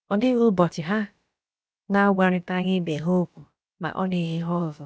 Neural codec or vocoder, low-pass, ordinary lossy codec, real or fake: codec, 16 kHz, about 1 kbps, DyCAST, with the encoder's durations; none; none; fake